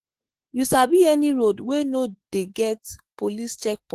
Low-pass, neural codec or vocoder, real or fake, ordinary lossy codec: 14.4 kHz; autoencoder, 48 kHz, 32 numbers a frame, DAC-VAE, trained on Japanese speech; fake; Opus, 16 kbps